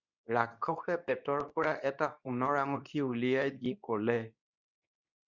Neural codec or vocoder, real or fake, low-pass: codec, 24 kHz, 0.9 kbps, WavTokenizer, medium speech release version 2; fake; 7.2 kHz